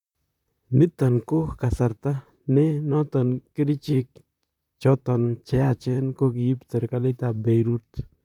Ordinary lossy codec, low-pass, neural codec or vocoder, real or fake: none; 19.8 kHz; vocoder, 44.1 kHz, 128 mel bands, Pupu-Vocoder; fake